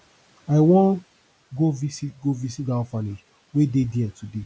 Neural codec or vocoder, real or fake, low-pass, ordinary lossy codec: none; real; none; none